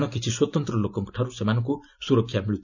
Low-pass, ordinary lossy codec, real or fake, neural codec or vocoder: 7.2 kHz; none; real; none